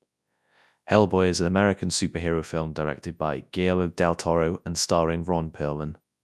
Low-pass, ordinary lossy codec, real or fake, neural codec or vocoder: none; none; fake; codec, 24 kHz, 0.9 kbps, WavTokenizer, large speech release